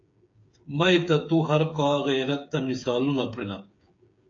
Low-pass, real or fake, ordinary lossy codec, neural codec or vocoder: 7.2 kHz; fake; AAC, 48 kbps; codec, 16 kHz, 8 kbps, FreqCodec, smaller model